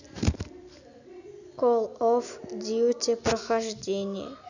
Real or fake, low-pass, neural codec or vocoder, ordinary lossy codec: real; 7.2 kHz; none; none